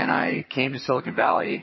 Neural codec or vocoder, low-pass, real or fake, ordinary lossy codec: vocoder, 22.05 kHz, 80 mel bands, HiFi-GAN; 7.2 kHz; fake; MP3, 24 kbps